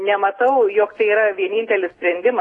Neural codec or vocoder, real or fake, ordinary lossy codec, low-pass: none; real; AAC, 32 kbps; 10.8 kHz